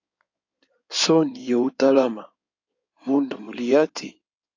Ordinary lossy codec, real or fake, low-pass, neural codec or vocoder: AAC, 48 kbps; fake; 7.2 kHz; codec, 16 kHz in and 24 kHz out, 2.2 kbps, FireRedTTS-2 codec